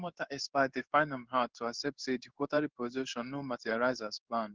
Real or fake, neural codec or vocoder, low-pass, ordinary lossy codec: fake; codec, 16 kHz in and 24 kHz out, 1 kbps, XY-Tokenizer; 7.2 kHz; Opus, 16 kbps